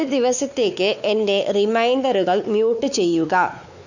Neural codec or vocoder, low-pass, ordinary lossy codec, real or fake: codec, 16 kHz, 4 kbps, X-Codec, WavLM features, trained on Multilingual LibriSpeech; 7.2 kHz; none; fake